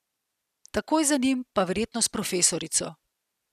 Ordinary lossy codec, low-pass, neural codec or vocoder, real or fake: none; 14.4 kHz; none; real